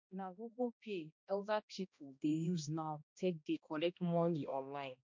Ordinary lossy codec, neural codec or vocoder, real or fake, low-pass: MP3, 64 kbps; codec, 16 kHz, 1 kbps, X-Codec, HuBERT features, trained on balanced general audio; fake; 7.2 kHz